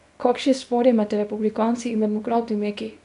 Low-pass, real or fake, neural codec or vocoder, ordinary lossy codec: 10.8 kHz; fake; codec, 24 kHz, 0.9 kbps, WavTokenizer, small release; none